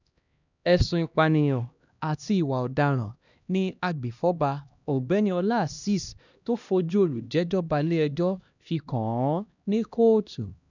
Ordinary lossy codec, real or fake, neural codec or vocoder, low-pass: none; fake; codec, 16 kHz, 1 kbps, X-Codec, HuBERT features, trained on LibriSpeech; 7.2 kHz